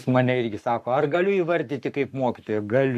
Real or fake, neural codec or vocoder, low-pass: fake; codec, 44.1 kHz, 7.8 kbps, Pupu-Codec; 14.4 kHz